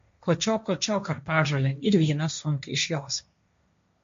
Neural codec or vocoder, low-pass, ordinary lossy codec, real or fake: codec, 16 kHz, 1.1 kbps, Voila-Tokenizer; 7.2 kHz; MP3, 48 kbps; fake